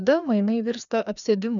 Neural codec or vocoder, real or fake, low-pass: codec, 16 kHz, 2 kbps, FreqCodec, larger model; fake; 7.2 kHz